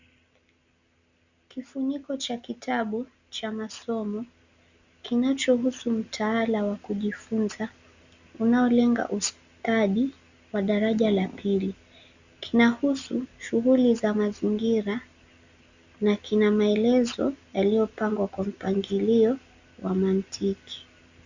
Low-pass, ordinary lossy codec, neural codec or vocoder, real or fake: 7.2 kHz; Opus, 64 kbps; none; real